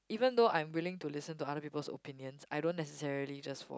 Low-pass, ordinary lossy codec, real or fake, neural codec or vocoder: none; none; real; none